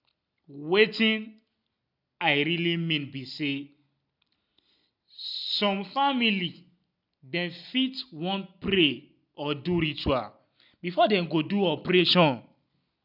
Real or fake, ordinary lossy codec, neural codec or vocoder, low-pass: real; none; none; 5.4 kHz